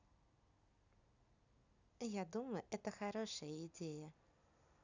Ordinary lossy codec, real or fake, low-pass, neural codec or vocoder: none; real; 7.2 kHz; none